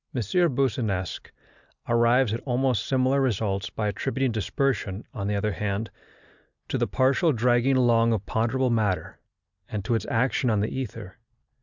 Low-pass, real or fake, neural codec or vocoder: 7.2 kHz; real; none